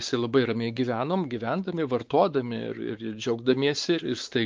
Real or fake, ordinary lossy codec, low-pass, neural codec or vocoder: fake; Opus, 24 kbps; 7.2 kHz; codec, 16 kHz, 4 kbps, X-Codec, WavLM features, trained on Multilingual LibriSpeech